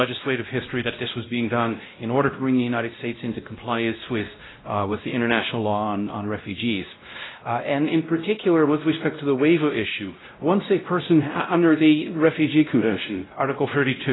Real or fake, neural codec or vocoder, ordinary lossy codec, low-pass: fake; codec, 16 kHz, 0.5 kbps, X-Codec, WavLM features, trained on Multilingual LibriSpeech; AAC, 16 kbps; 7.2 kHz